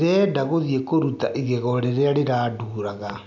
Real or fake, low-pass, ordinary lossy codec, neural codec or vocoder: real; 7.2 kHz; none; none